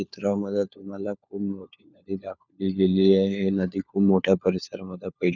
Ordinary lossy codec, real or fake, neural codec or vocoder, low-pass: none; fake; codec, 16 kHz, 4 kbps, FunCodec, trained on LibriTTS, 50 frames a second; 7.2 kHz